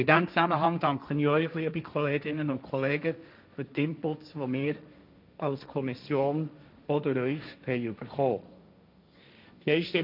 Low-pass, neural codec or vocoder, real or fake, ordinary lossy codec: 5.4 kHz; codec, 16 kHz, 1.1 kbps, Voila-Tokenizer; fake; none